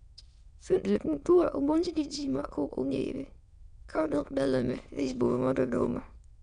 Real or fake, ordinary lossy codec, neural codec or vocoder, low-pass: fake; none; autoencoder, 22.05 kHz, a latent of 192 numbers a frame, VITS, trained on many speakers; 9.9 kHz